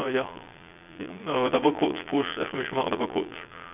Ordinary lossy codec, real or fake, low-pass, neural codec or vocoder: none; fake; 3.6 kHz; vocoder, 22.05 kHz, 80 mel bands, Vocos